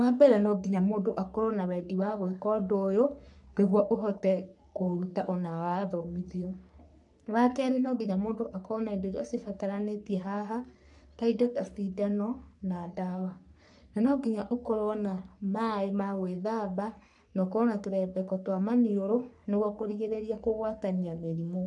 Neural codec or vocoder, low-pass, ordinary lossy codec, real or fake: codec, 44.1 kHz, 3.4 kbps, Pupu-Codec; 10.8 kHz; none; fake